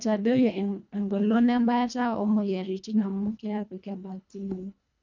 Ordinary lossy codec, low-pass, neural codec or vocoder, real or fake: none; 7.2 kHz; codec, 24 kHz, 1.5 kbps, HILCodec; fake